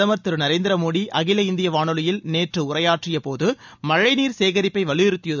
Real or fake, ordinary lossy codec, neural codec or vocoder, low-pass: real; none; none; 7.2 kHz